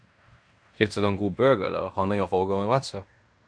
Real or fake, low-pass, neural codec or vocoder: fake; 9.9 kHz; codec, 16 kHz in and 24 kHz out, 0.9 kbps, LongCat-Audio-Codec, fine tuned four codebook decoder